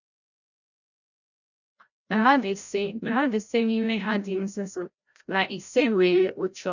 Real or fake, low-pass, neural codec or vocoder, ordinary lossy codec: fake; 7.2 kHz; codec, 16 kHz, 0.5 kbps, FreqCodec, larger model; none